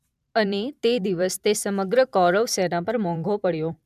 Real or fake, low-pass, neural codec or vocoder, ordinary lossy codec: fake; 14.4 kHz; vocoder, 44.1 kHz, 128 mel bands every 512 samples, BigVGAN v2; none